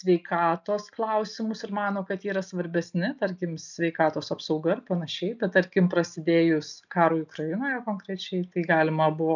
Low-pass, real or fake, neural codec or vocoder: 7.2 kHz; real; none